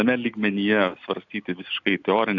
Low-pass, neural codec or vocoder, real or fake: 7.2 kHz; none; real